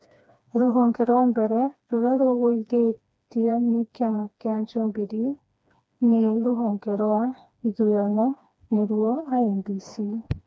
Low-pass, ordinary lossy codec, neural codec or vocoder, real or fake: none; none; codec, 16 kHz, 2 kbps, FreqCodec, smaller model; fake